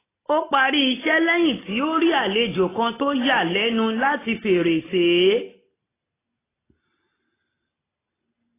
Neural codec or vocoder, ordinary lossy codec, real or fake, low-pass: none; AAC, 16 kbps; real; 3.6 kHz